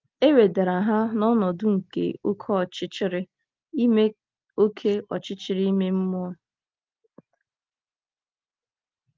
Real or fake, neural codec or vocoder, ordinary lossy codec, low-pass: real; none; Opus, 32 kbps; 7.2 kHz